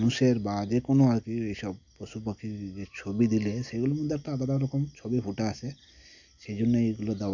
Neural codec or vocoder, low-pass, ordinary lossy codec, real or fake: none; 7.2 kHz; none; real